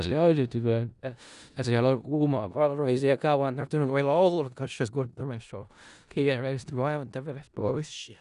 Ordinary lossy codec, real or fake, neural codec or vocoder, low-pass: none; fake; codec, 16 kHz in and 24 kHz out, 0.4 kbps, LongCat-Audio-Codec, four codebook decoder; 10.8 kHz